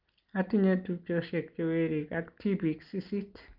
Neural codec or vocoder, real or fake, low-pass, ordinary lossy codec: none; real; 5.4 kHz; Opus, 24 kbps